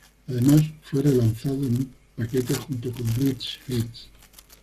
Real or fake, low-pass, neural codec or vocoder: fake; 14.4 kHz; codec, 44.1 kHz, 7.8 kbps, Pupu-Codec